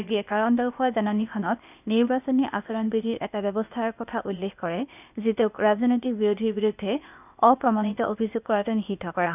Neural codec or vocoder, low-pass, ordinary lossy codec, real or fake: codec, 16 kHz, 0.8 kbps, ZipCodec; 3.6 kHz; none; fake